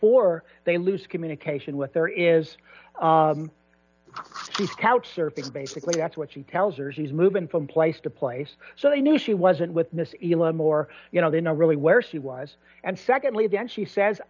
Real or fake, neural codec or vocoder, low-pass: real; none; 7.2 kHz